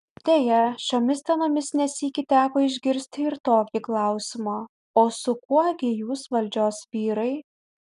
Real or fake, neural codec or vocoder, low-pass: real; none; 10.8 kHz